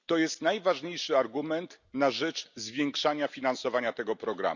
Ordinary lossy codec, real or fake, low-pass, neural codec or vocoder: none; real; 7.2 kHz; none